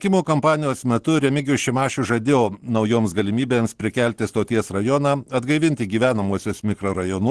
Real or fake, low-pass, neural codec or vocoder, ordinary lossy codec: real; 10.8 kHz; none; Opus, 16 kbps